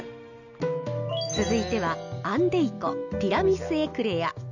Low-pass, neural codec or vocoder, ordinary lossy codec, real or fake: 7.2 kHz; none; none; real